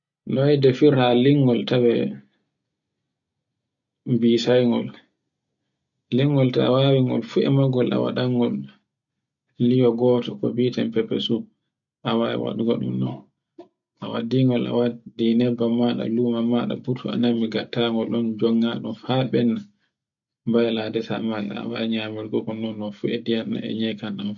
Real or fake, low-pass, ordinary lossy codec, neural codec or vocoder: real; 7.2 kHz; none; none